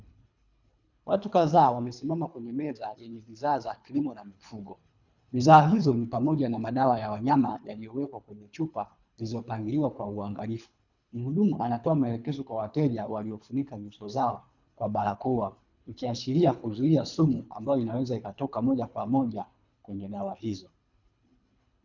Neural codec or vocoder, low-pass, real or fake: codec, 24 kHz, 3 kbps, HILCodec; 7.2 kHz; fake